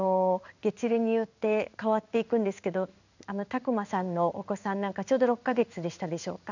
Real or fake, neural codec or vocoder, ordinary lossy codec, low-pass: fake; codec, 16 kHz in and 24 kHz out, 1 kbps, XY-Tokenizer; none; 7.2 kHz